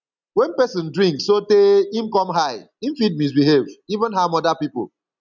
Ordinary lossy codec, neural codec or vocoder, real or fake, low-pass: none; none; real; 7.2 kHz